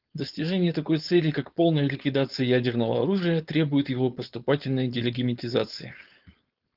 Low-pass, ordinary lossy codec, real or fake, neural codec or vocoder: 5.4 kHz; Opus, 32 kbps; fake; codec, 16 kHz, 4.8 kbps, FACodec